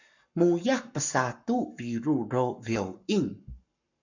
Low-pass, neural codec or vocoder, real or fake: 7.2 kHz; codec, 44.1 kHz, 7.8 kbps, Pupu-Codec; fake